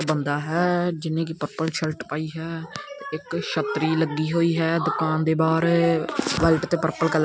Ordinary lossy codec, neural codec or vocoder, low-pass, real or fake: none; none; none; real